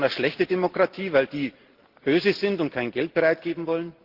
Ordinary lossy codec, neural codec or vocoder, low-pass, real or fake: Opus, 16 kbps; none; 5.4 kHz; real